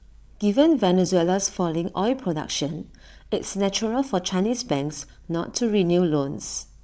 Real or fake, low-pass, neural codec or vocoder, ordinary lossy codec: fake; none; codec, 16 kHz, 16 kbps, FreqCodec, larger model; none